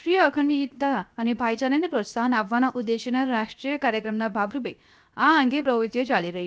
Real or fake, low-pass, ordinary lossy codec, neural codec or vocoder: fake; none; none; codec, 16 kHz, 0.7 kbps, FocalCodec